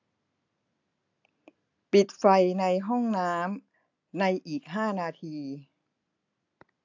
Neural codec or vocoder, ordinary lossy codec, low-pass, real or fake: none; AAC, 48 kbps; 7.2 kHz; real